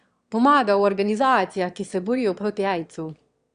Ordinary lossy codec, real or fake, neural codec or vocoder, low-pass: Opus, 64 kbps; fake; autoencoder, 22.05 kHz, a latent of 192 numbers a frame, VITS, trained on one speaker; 9.9 kHz